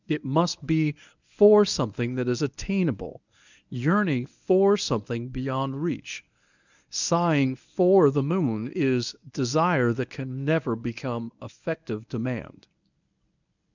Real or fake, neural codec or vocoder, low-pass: fake; codec, 24 kHz, 0.9 kbps, WavTokenizer, medium speech release version 1; 7.2 kHz